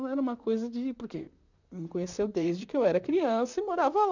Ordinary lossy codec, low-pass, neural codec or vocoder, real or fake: none; 7.2 kHz; vocoder, 44.1 kHz, 128 mel bands, Pupu-Vocoder; fake